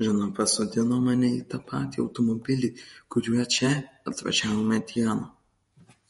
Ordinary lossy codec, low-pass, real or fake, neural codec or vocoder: MP3, 48 kbps; 19.8 kHz; fake; vocoder, 48 kHz, 128 mel bands, Vocos